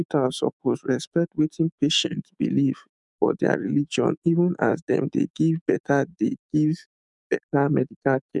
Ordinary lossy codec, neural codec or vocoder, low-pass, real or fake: none; autoencoder, 48 kHz, 128 numbers a frame, DAC-VAE, trained on Japanese speech; 10.8 kHz; fake